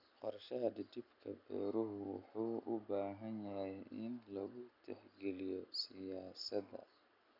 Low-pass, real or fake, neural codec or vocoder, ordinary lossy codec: 5.4 kHz; real; none; none